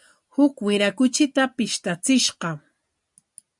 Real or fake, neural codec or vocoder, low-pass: real; none; 10.8 kHz